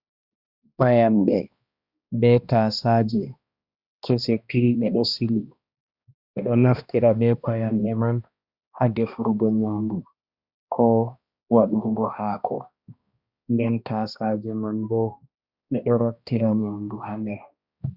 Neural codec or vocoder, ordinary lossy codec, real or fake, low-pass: codec, 16 kHz, 1 kbps, X-Codec, HuBERT features, trained on balanced general audio; Opus, 64 kbps; fake; 5.4 kHz